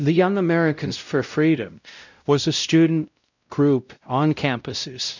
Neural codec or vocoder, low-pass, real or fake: codec, 16 kHz, 0.5 kbps, X-Codec, WavLM features, trained on Multilingual LibriSpeech; 7.2 kHz; fake